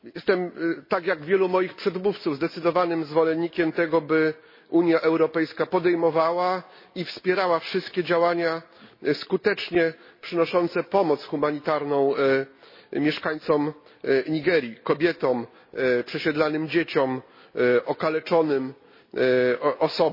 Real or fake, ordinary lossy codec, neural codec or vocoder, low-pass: real; MP3, 24 kbps; none; 5.4 kHz